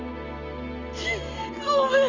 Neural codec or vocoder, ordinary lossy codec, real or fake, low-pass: none; Opus, 32 kbps; real; 7.2 kHz